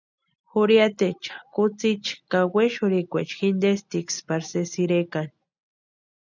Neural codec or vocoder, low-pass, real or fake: none; 7.2 kHz; real